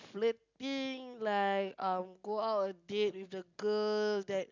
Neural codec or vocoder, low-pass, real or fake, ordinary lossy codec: none; 7.2 kHz; real; MP3, 64 kbps